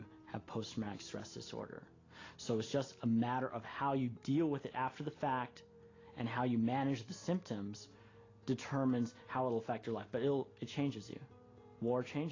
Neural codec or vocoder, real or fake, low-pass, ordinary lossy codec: none; real; 7.2 kHz; AAC, 32 kbps